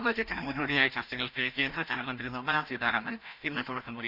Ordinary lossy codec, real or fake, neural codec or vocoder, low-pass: none; fake; codec, 16 kHz, 1 kbps, FreqCodec, larger model; 5.4 kHz